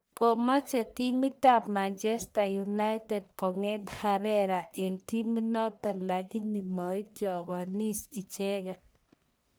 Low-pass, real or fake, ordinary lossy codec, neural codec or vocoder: none; fake; none; codec, 44.1 kHz, 1.7 kbps, Pupu-Codec